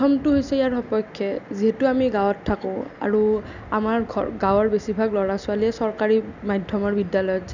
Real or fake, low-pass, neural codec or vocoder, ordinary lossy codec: real; 7.2 kHz; none; none